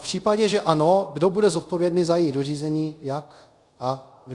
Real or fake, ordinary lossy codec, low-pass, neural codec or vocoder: fake; Opus, 64 kbps; 10.8 kHz; codec, 24 kHz, 0.5 kbps, DualCodec